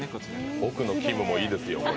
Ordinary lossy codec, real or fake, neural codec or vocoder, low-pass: none; real; none; none